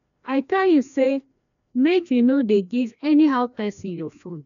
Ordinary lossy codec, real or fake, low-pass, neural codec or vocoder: none; fake; 7.2 kHz; codec, 16 kHz, 1 kbps, FreqCodec, larger model